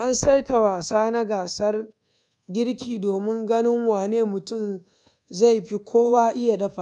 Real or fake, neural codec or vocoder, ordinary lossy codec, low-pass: fake; codec, 24 kHz, 1.2 kbps, DualCodec; none; 10.8 kHz